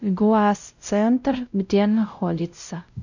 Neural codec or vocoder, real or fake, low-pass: codec, 16 kHz, 0.5 kbps, X-Codec, WavLM features, trained on Multilingual LibriSpeech; fake; 7.2 kHz